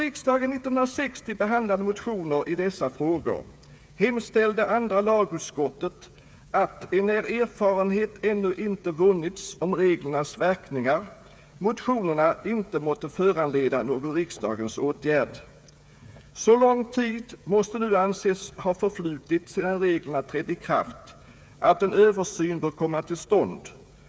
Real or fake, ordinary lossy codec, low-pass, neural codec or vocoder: fake; none; none; codec, 16 kHz, 8 kbps, FreqCodec, smaller model